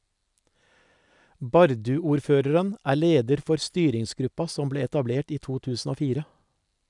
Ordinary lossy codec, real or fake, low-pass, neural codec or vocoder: none; real; 10.8 kHz; none